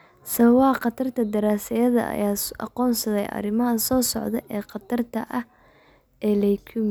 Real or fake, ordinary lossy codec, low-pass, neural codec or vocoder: real; none; none; none